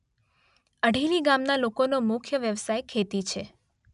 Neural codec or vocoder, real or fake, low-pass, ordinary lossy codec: none; real; 10.8 kHz; none